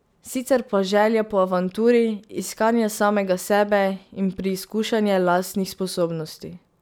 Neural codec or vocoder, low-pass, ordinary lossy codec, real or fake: none; none; none; real